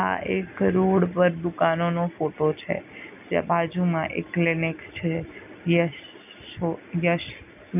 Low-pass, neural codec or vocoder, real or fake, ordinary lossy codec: 3.6 kHz; none; real; none